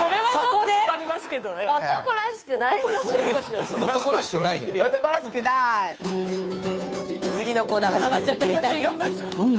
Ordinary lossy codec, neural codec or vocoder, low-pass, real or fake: none; codec, 16 kHz, 2 kbps, FunCodec, trained on Chinese and English, 25 frames a second; none; fake